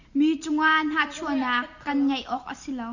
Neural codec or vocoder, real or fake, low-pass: none; real; 7.2 kHz